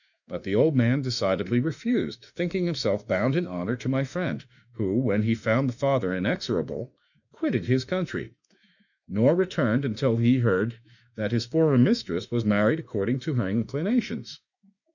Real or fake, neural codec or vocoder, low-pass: fake; autoencoder, 48 kHz, 32 numbers a frame, DAC-VAE, trained on Japanese speech; 7.2 kHz